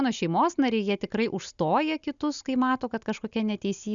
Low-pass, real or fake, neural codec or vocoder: 7.2 kHz; real; none